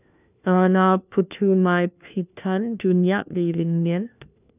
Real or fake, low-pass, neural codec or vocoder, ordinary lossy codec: fake; 3.6 kHz; codec, 16 kHz, 1 kbps, FunCodec, trained on LibriTTS, 50 frames a second; none